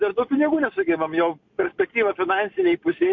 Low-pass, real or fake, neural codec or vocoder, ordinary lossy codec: 7.2 kHz; real; none; MP3, 48 kbps